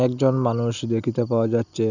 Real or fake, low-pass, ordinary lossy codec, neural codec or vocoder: real; 7.2 kHz; none; none